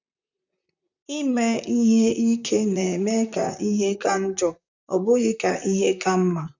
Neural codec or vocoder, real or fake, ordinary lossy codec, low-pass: vocoder, 44.1 kHz, 128 mel bands, Pupu-Vocoder; fake; none; 7.2 kHz